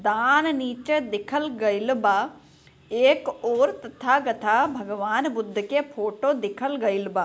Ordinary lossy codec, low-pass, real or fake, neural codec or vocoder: none; none; real; none